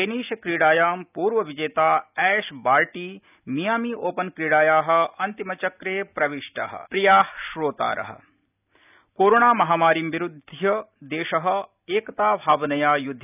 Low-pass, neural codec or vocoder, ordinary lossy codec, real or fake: 3.6 kHz; none; none; real